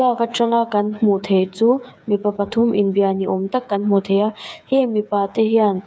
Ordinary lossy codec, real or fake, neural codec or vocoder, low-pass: none; fake; codec, 16 kHz, 8 kbps, FreqCodec, smaller model; none